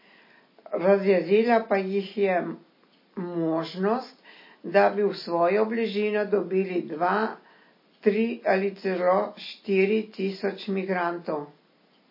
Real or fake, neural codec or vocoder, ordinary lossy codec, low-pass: real; none; MP3, 24 kbps; 5.4 kHz